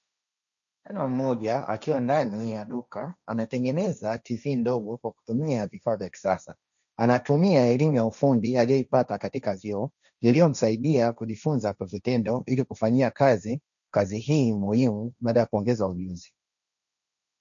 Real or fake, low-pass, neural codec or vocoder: fake; 7.2 kHz; codec, 16 kHz, 1.1 kbps, Voila-Tokenizer